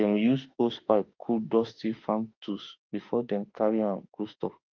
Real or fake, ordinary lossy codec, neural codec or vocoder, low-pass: fake; Opus, 24 kbps; autoencoder, 48 kHz, 32 numbers a frame, DAC-VAE, trained on Japanese speech; 7.2 kHz